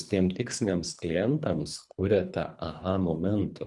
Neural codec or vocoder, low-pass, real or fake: codec, 24 kHz, 3 kbps, HILCodec; 10.8 kHz; fake